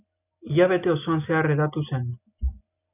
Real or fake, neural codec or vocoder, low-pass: real; none; 3.6 kHz